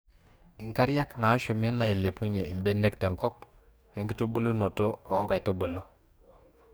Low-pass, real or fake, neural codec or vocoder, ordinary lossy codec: none; fake; codec, 44.1 kHz, 2.6 kbps, DAC; none